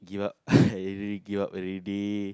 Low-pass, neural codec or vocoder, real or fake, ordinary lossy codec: none; none; real; none